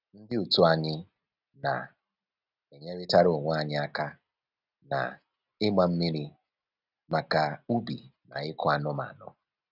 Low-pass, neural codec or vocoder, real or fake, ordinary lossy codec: 5.4 kHz; none; real; none